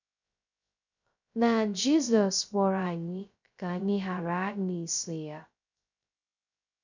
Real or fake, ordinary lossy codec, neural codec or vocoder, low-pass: fake; none; codec, 16 kHz, 0.2 kbps, FocalCodec; 7.2 kHz